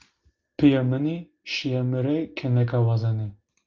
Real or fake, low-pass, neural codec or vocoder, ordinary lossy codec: real; 7.2 kHz; none; Opus, 32 kbps